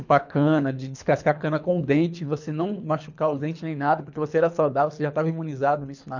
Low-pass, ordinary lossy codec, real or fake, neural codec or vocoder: 7.2 kHz; none; fake; codec, 24 kHz, 3 kbps, HILCodec